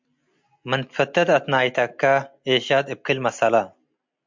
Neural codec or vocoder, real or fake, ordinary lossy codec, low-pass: none; real; MP3, 64 kbps; 7.2 kHz